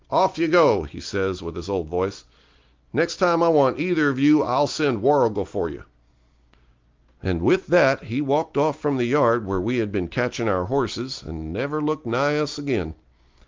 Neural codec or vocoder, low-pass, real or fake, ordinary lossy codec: none; 7.2 kHz; real; Opus, 32 kbps